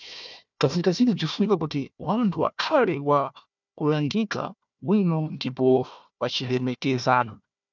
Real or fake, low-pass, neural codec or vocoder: fake; 7.2 kHz; codec, 16 kHz, 1 kbps, FunCodec, trained on Chinese and English, 50 frames a second